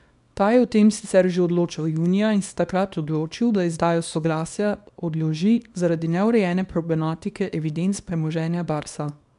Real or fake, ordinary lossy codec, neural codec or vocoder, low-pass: fake; none; codec, 24 kHz, 0.9 kbps, WavTokenizer, small release; 10.8 kHz